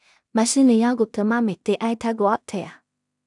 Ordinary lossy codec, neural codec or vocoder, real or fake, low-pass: none; codec, 16 kHz in and 24 kHz out, 0.4 kbps, LongCat-Audio-Codec, two codebook decoder; fake; 10.8 kHz